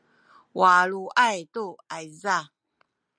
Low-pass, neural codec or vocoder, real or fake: 9.9 kHz; none; real